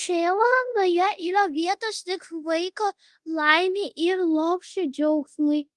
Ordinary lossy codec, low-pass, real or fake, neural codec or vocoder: Opus, 24 kbps; 10.8 kHz; fake; codec, 24 kHz, 0.5 kbps, DualCodec